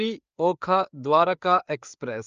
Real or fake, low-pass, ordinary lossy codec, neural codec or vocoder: fake; 7.2 kHz; Opus, 24 kbps; codec, 16 kHz, 4 kbps, FreqCodec, larger model